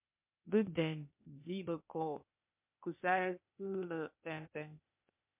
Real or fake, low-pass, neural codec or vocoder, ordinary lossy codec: fake; 3.6 kHz; codec, 16 kHz, 0.8 kbps, ZipCodec; MP3, 32 kbps